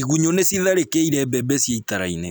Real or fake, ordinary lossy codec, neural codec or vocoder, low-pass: real; none; none; none